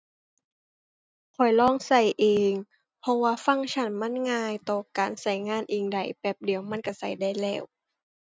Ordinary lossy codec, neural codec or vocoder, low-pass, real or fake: none; none; none; real